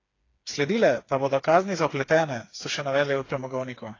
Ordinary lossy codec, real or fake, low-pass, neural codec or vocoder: AAC, 32 kbps; fake; 7.2 kHz; codec, 16 kHz, 4 kbps, FreqCodec, smaller model